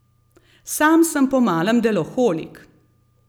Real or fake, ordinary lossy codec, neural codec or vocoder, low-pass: real; none; none; none